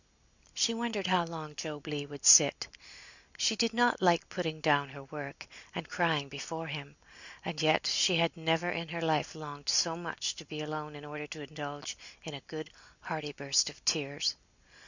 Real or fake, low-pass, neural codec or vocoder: real; 7.2 kHz; none